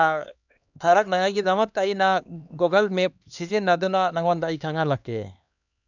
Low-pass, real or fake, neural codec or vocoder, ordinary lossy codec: 7.2 kHz; fake; codec, 16 kHz, 2 kbps, X-Codec, HuBERT features, trained on LibriSpeech; none